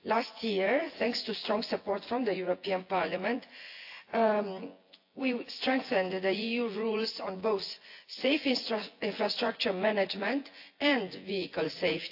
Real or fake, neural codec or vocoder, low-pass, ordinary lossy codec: fake; vocoder, 24 kHz, 100 mel bands, Vocos; 5.4 kHz; AAC, 32 kbps